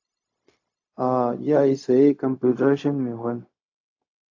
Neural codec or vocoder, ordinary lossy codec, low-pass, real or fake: codec, 16 kHz, 0.4 kbps, LongCat-Audio-Codec; AAC, 48 kbps; 7.2 kHz; fake